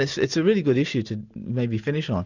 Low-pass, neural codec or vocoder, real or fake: 7.2 kHz; none; real